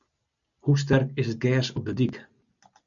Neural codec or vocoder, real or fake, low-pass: none; real; 7.2 kHz